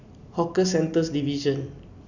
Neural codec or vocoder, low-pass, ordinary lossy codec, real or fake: none; 7.2 kHz; none; real